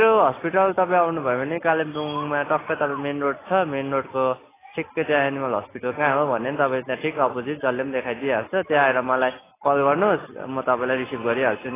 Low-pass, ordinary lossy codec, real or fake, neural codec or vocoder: 3.6 kHz; AAC, 16 kbps; real; none